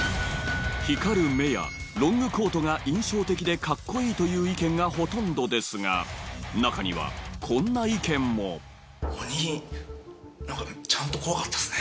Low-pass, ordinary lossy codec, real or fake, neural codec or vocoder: none; none; real; none